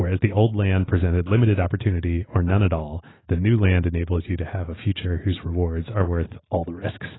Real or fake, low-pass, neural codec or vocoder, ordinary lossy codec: real; 7.2 kHz; none; AAC, 16 kbps